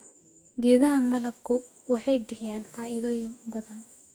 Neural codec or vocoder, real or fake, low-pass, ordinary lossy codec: codec, 44.1 kHz, 2.6 kbps, DAC; fake; none; none